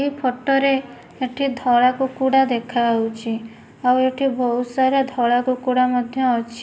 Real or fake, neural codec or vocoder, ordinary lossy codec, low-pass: real; none; none; none